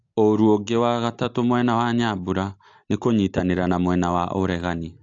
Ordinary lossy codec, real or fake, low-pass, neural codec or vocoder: AAC, 48 kbps; real; 7.2 kHz; none